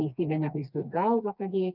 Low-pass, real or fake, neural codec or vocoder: 5.4 kHz; fake; codec, 16 kHz, 2 kbps, FreqCodec, smaller model